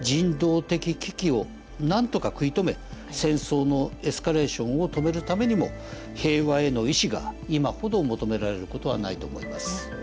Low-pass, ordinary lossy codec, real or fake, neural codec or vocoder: none; none; real; none